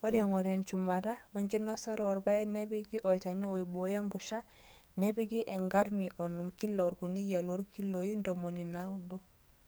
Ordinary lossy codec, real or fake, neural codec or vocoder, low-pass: none; fake; codec, 44.1 kHz, 2.6 kbps, SNAC; none